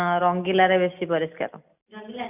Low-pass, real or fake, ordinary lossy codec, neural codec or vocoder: 3.6 kHz; real; none; none